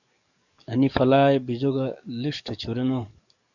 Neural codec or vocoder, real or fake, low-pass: codec, 44.1 kHz, 7.8 kbps, DAC; fake; 7.2 kHz